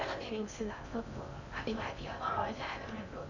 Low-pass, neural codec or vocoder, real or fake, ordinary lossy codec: 7.2 kHz; codec, 16 kHz in and 24 kHz out, 0.6 kbps, FocalCodec, streaming, 4096 codes; fake; none